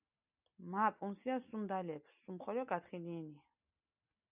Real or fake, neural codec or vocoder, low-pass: real; none; 3.6 kHz